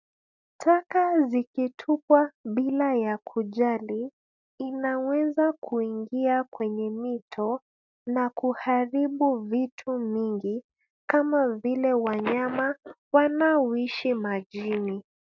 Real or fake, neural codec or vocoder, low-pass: real; none; 7.2 kHz